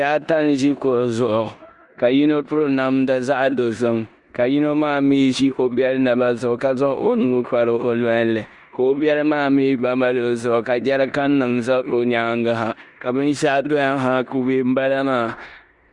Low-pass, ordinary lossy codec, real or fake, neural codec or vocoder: 10.8 kHz; Opus, 64 kbps; fake; codec, 16 kHz in and 24 kHz out, 0.9 kbps, LongCat-Audio-Codec, four codebook decoder